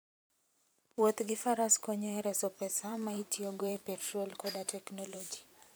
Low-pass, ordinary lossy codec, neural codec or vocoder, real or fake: none; none; vocoder, 44.1 kHz, 128 mel bands every 512 samples, BigVGAN v2; fake